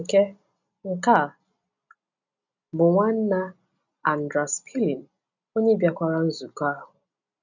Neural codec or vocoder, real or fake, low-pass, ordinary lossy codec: none; real; 7.2 kHz; none